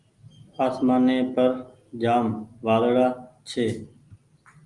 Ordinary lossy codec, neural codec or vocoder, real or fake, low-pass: Opus, 32 kbps; none; real; 10.8 kHz